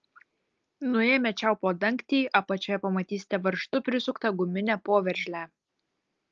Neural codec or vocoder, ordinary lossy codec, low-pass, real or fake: none; Opus, 24 kbps; 7.2 kHz; real